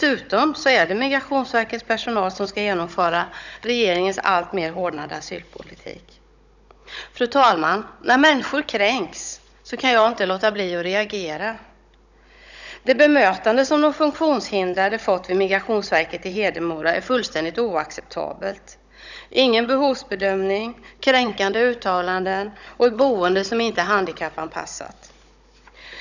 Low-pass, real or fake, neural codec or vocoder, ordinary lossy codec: 7.2 kHz; fake; codec, 16 kHz, 16 kbps, FunCodec, trained on Chinese and English, 50 frames a second; none